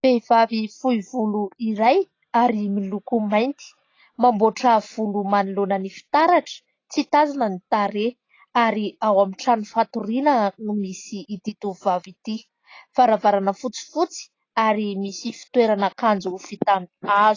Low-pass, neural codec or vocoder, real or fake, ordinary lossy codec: 7.2 kHz; none; real; AAC, 32 kbps